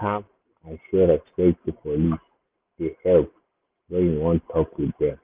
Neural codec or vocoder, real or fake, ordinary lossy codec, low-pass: none; real; Opus, 24 kbps; 3.6 kHz